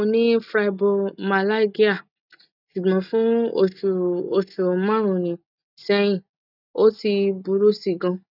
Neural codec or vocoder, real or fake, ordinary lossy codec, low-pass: none; real; none; 5.4 kHz